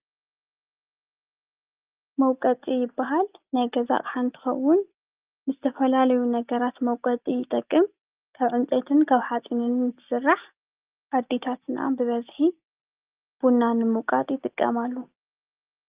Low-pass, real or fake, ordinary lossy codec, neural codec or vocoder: 3.6 kHz; real; Opus, 32 kbps; none